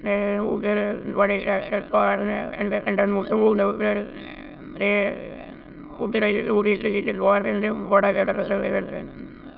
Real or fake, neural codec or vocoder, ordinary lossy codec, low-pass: fake; autoencoder, 22.05 kHz, a latent of 192 numbers a frame, VITS, trained on many speakers; none; 5.4 kHz